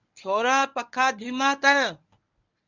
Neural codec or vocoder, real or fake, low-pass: codec, 24 kHz, 0.9 kbps, WavTokenizer, medium speech release version 1; fake; 7.2 kHz